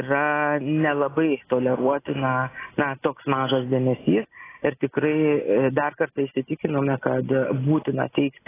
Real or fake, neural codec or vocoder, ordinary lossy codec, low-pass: real; none; AAC, 16 kbps; 3.6 kHz